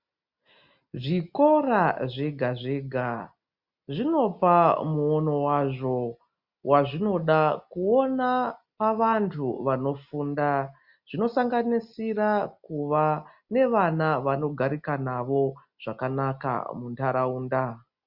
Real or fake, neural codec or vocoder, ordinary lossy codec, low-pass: real; none; Opus, 64 kbps; 5.4 kHz